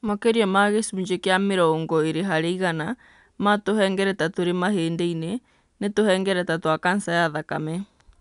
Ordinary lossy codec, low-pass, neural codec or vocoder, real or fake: none; 10.8 kHz; none; real